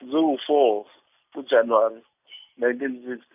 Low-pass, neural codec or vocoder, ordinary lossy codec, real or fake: 3.6 kHz; none; none; real